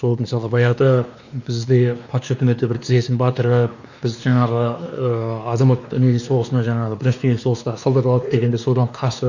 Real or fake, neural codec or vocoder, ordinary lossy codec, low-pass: fake; codec, 16 kHz, 2 kbps, X-Codec, WavLM features, trained on Multilingual LibriSpeech; none; 7.2 kHz